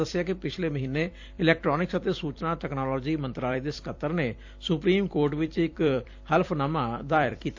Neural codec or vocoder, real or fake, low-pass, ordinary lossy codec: vocoder, 44.1 kHz, 128 mel bands every 256 samples, BigVGAN v2; fake; 7.2 kHz; AAC, 48 kbps